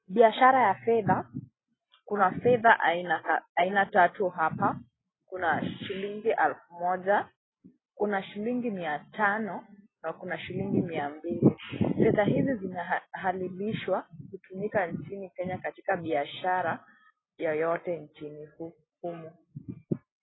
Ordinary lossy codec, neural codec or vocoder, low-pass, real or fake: AAC, 16 kbps; none; 7.2 kHz; real